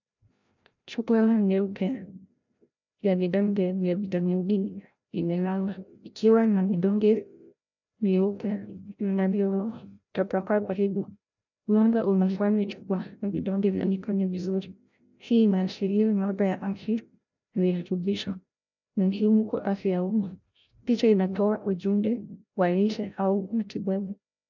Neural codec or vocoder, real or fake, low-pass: codec, 16 kHz, 0.5 kbps, FreqCodec, larger model; fake; 7.2 kHz